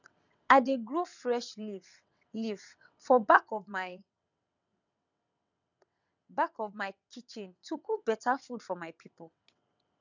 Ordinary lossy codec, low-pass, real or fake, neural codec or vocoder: none; 7.2 kHz; fake; vocoder, 22.05 kHz, 80 mel bands, WaveNeXt